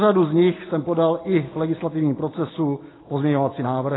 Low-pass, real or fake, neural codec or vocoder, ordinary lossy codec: 7.2 kHz; real; none; AAC, 16 kbps